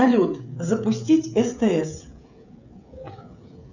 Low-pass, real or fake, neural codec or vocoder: 7.2 kHz; fake; codec, 16 kHz, 16 kbps, FreqCodec, smaller model